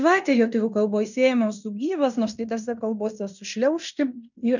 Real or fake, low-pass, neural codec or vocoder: fake; 7.2 kHz; codec, 16 kHz in and 24 kHz out, 0.9 kbps, LongCat-Audio-Codec, fine tuned four codebook decoder